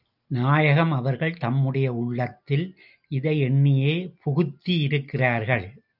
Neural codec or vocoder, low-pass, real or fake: none; 5.4 kHz; real